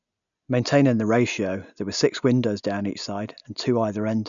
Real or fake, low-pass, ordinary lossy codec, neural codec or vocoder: real; 7.2 kHz; none; none